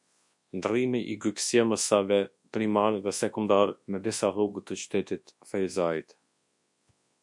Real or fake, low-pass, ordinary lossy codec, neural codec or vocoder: fake; 10.8 kHz; MP3, 64 kbps; codec, 24 kHz, 0.9 kbps, WavTokenizer, large speech release